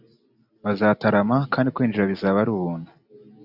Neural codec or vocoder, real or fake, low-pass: none; real; 5.4 kHz